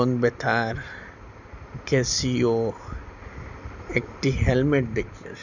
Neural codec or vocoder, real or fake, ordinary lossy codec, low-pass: none; real; none; 7.2 kHz